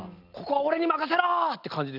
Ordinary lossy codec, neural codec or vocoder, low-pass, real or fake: none; none; 5.4 kHz; real